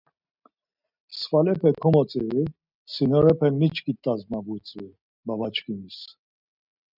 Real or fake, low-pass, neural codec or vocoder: real; 5.4 kHz; none